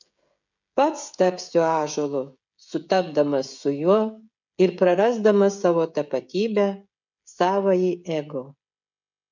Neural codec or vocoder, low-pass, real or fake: codec, 16 kHz, 16 kbps, FreqCodec, smaller model; 7.2 kHz; fake